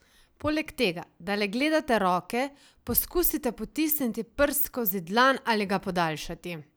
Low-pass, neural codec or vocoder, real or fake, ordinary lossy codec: none; none; real; none